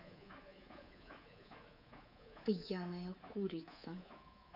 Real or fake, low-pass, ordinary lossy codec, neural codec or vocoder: fake; 5.4 kHz; none; codec, 24 kHz, 3.1 kbps, DualCodec